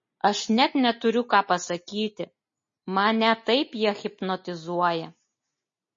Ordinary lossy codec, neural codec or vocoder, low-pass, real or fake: MP3, 32 kbps; none; 7.2 kHz; real